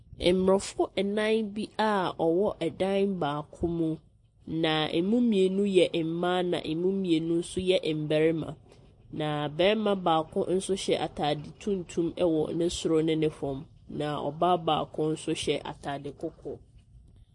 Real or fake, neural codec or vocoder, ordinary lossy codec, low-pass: real; none; MP3, 48 kbps; 10.8 kHz